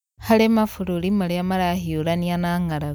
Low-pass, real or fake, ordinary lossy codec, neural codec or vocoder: none; real; none; none